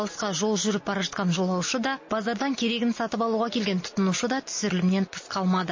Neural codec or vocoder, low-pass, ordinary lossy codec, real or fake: none; 7.2 kHz; MP3, 32 kbps; real